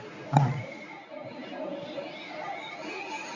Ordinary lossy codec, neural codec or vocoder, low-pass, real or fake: none; codec, 24 kHz, 0.9 kbps, WavTokenizer, medium speech release version 1; 7.2 kHz; fake